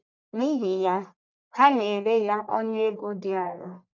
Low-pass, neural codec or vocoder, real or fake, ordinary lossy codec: 7.2 kHz; codec, 44.1 kHz, 1.7 kbps, Pupu-Codec; fake; none